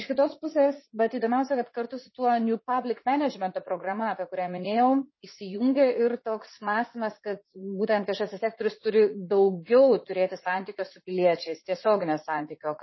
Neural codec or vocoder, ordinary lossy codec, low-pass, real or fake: vocoder, 44.1 kHz, 80 mel bands, Vocos; MP3, 24 kbps; 7.2 kHz; fake